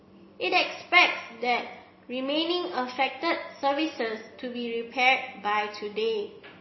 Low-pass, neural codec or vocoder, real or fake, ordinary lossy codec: 7.2 kHz; none; real; MP3, 24 kbps